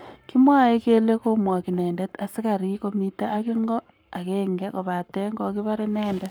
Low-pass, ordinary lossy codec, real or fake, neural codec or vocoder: none; none; fake; codec, 44.1 kHz, 7.8 kbps, Pupu-Codec